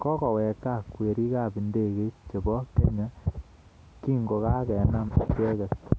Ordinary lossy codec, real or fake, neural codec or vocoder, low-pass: none; real; none; none